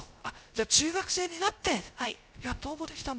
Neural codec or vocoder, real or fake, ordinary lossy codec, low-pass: codec, 16 kHz, 0.3 kbps, FocalCodec; fake; none; none